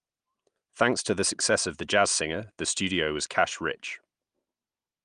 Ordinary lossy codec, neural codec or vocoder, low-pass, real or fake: Opus, 32 kbps; none; 9.9 kHz; real